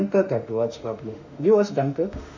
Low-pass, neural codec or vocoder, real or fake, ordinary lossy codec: 7.2 kHz; autoencoder, 48 kHz, 32 numbers a frame, DAC-VAE, trained on Japanese speech; fake; MP3, 64 kbps